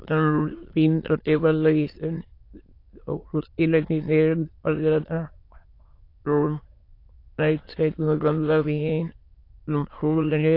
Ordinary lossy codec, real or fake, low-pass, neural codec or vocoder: AAC, 32 kbps; fake; 5.4 kHz; autoencoder, 22.05 kHz, a latent of 192 numbers a frame, VITS, trained on many speakers